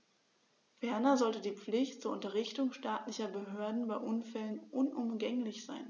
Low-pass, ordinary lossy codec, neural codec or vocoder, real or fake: 7.2 kHz; none; none; real